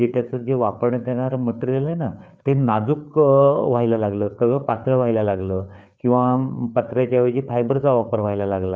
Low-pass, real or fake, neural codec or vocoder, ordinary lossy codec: none; fake; codec, 16 kHz, 4 kbps, FreqCodec, larger model; none